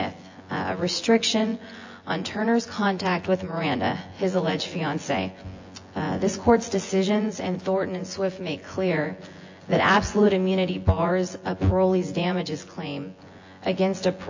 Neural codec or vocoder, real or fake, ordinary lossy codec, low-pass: vocoder, 24 kHz, 100 mel bands, Vocos; fake; AAC, 48 kbps; 7.2 kHz